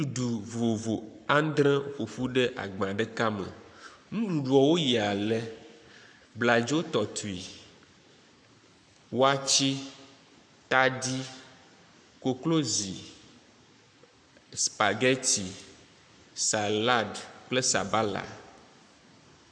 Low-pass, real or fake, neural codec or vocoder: 9.9 kHz; fake; codec, 44.1 kHz, 7.8 kbps, Pupu-Codec